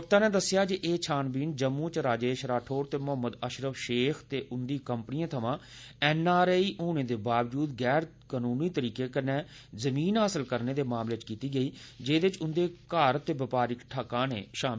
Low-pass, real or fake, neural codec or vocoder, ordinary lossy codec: none; real; none; none